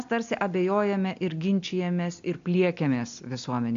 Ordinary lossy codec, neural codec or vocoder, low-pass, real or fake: AAC, 64 kbps; none; 7.2 kHz; real